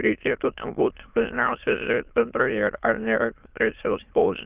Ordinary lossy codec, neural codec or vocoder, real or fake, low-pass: Opus, 24 kbps; autoencoder, 22.05 kHz, a latent of 192 numbers a frame, VITS, trained on many speakers; fake; 3.6 kHz